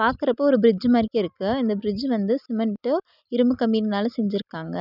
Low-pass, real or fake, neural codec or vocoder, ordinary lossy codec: 5.4 kHz; real; none; none